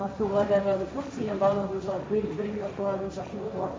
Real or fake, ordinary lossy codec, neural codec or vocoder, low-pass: fake; none; codec, 16 kHz, 1.1 kbps, Voila-Tokenizer; none